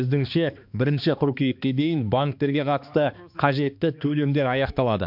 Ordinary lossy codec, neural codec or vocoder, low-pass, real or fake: MP3, 48 kbps; codec, 16 kHz, 2 kbps, X-Codec, HuBERT features, trained on balanced general audio; 5.4 kHz; fake